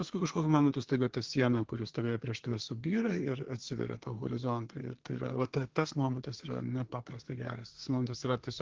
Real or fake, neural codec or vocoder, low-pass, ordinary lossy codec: fake; codec, 32 kHz, 1.9 kbps, SNAC; 7.2 kHz; Opus, 16 kbps